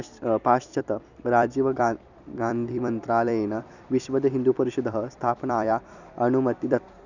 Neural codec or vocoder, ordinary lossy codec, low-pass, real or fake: vocoder, 44.1 kHz, 128 mel bands every 256 samples, BigVGAN v2; none; 7.2 kHz; fake